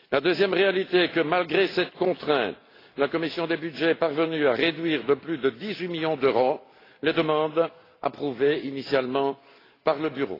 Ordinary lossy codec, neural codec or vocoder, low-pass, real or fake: AAC, 24 kbps; none; 5.4 kHz; real